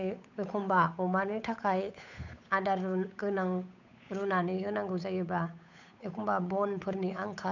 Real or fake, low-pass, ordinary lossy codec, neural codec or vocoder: fake; 7.2 kHz; none; codec, 16 kHz, 8 kbps, FunCodec, trained on Chinese and English, 25 frames a second